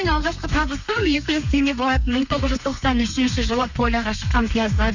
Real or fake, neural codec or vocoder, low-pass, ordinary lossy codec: fake; codec, 32 kHz, 1.9 kbps, SNAC; 7.2 kHz; none